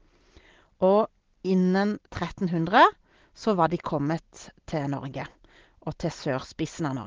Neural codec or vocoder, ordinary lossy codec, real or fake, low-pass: none; Opus, 16 kbps; real; 7.2 kHz